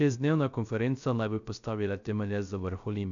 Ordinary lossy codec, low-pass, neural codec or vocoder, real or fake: none; 7.2 kHz; codec, 16 kHz, 0.3 kbps, FocalCodec; fake